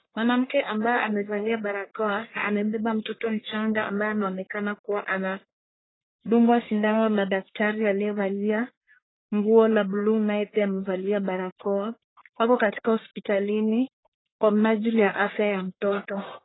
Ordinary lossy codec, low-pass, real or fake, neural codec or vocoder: AAC, 16 kbps; 7.2 kHz; fake; codec, 44.1 kHz, 1.7 kbps, Pupu-Codec